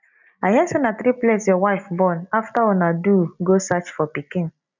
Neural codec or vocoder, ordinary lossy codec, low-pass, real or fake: none; none; 7.2 kHz; real